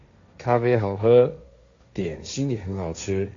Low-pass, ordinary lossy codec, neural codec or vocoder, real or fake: 7.2 kHz; AAC, 64 kbps; codec, 16 kHz, 1.1 kbps, Voila-Tokenizer; fake